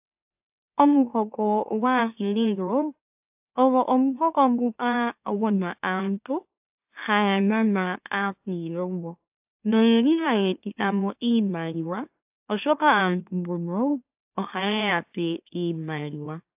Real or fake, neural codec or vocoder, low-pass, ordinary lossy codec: fake; autoencoder, 44.1 kHz, a latent of 192 numbers a frame, MeloTTS; 3.6 kHz; AAC, 32 kbps